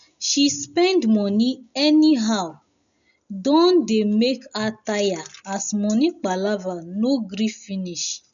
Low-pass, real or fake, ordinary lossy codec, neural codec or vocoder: 7.2 kHz; real; none; none